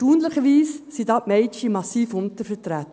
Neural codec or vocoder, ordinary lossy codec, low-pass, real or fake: none; none; none; real